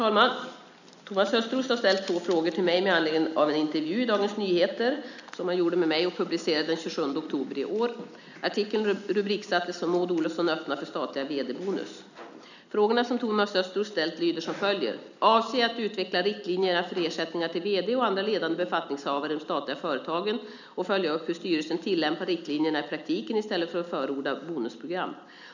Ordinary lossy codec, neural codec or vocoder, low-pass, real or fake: none; none; 7.2 kHz; real